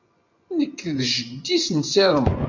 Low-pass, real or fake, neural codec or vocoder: 7.2 kHz; fake; vocoder, 24 kHz, 100 mel bands, Vocos